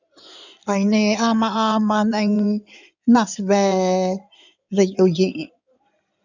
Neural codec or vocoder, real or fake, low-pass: codec, 16 kHz in and 24 kHz out, 2.2 kbps, FireRedTTS-2 codec; fake; 7.2 kHz